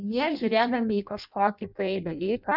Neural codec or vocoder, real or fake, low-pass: codec, 16 kHz in and 24 kHz out, 0.6 kbps, FireRedTTS-2 codec; fake; 5.4 kHz